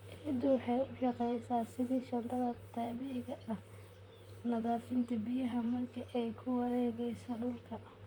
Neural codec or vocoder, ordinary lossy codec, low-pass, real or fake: vocoder, 44.1 kHz, 128 mel bands, Pupu-Vocoder; none; none; fake